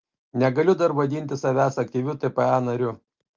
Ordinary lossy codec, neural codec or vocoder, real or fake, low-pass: Opus, 24 kbps; none; real; 7.2 kHz